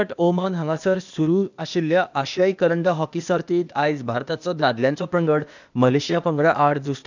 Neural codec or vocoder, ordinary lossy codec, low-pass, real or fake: codec, 16 kHz, 0.8 kbps, ZipCodec; none; 7.2 kHz; fake